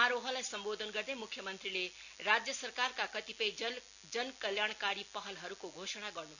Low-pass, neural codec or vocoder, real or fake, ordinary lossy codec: 7.2 kHz; none; real; none